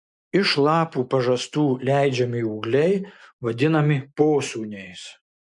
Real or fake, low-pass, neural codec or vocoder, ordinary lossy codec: real; 10.8 kHz; none; MP3, 64 kbps